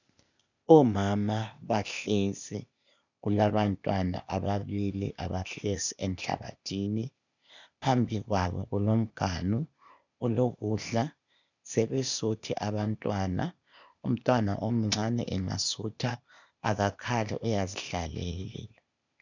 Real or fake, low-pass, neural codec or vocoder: fake; 7.2 kHz; codec, 16 kHz, 0.8 kbps, ZipCodec